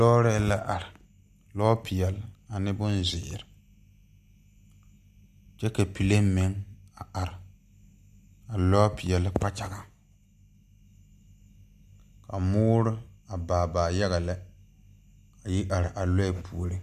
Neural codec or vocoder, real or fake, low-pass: none; real; 14.4 kHz